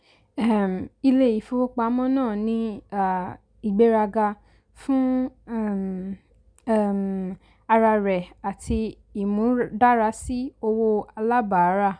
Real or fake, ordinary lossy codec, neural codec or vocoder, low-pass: real; none; none; 9.9 kHz